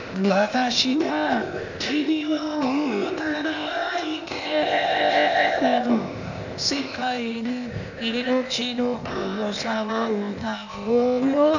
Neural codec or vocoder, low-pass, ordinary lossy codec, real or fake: codec, 16 kHz, 0.8 kbps, ZipCodec; 7.2 kHz; none; fake